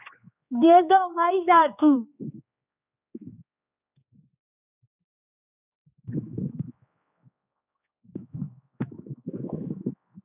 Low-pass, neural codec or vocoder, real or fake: 3.6 kHz; codec, 16 kHz, 2 kbps, X-Codec, HuBERT features, trained on general audio; fake